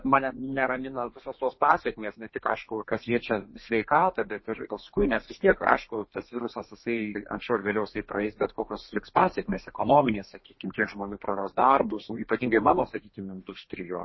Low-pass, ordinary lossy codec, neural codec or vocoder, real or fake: 7.2 kHz; MP3, 24 kbps; codec, 32 kHz, 1.9 kbps, SNAC; fake